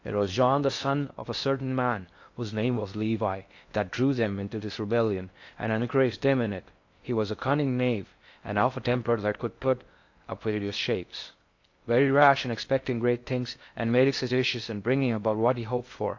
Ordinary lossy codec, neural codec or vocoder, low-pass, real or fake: AAC, 48 kbps; codec, 16 kHz in and 24 kHz out, 0.6 kbps, FocalCodec, streaming, 4096 codes; 7.2 kHz; fake